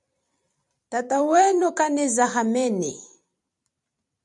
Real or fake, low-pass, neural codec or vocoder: fake; 10.8 kHz; vocoder, 44.1 kHz, 128 mel bands every 256 samples, BigVGAN v2